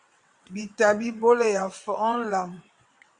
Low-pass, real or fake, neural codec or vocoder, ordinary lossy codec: 9.9 kHz; fake; vocoder, 22.05 kHz, 80 mel bands, WaveNeXt; Opus, 64 kbps